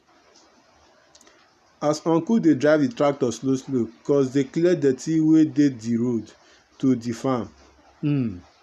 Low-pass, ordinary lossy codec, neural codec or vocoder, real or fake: 14.4 kHz; none; none; real